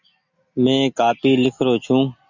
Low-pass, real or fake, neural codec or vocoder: 7.2 kHz; real; none